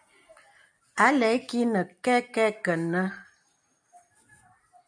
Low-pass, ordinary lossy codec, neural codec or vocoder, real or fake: 9.9 kHz; MP3, 96 kbps; none; real